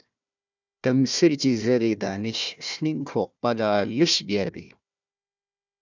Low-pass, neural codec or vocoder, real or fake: 7.2 kHz; codec, 16 kHz, 1 kbps, FunCodec, trained on Chinese and English, 50 frames a second; fake